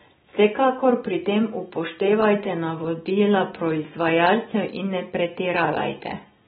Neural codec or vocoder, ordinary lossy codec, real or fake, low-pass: none; AAC, 16 kbps; real; 7.2 kHz